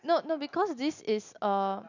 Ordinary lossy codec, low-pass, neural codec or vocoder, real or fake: none; 7.2 kHz; none; real